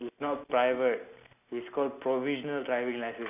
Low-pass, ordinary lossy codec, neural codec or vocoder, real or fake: 3.6 kHz; none; none; real